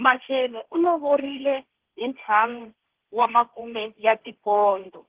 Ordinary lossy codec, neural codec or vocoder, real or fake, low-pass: Opus, 16 kbps; codec, 16 kHz, 1.1 kbps, Voila-Tokenizer; fake; 3.6 kHz